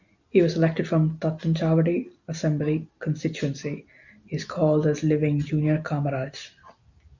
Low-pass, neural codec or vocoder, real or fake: 7.2 kHz; none; real